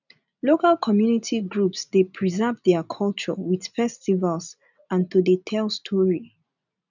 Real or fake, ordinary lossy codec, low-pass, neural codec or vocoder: real; none; 7.2 kHz; none